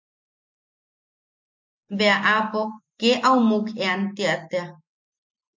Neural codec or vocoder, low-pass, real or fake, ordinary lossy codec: none; 7.2 kHz; real; MP3, 64 kbps